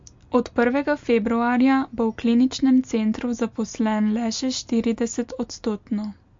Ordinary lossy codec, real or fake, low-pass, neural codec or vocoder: MP3, 48 kbps; real; 7.2 kHz; none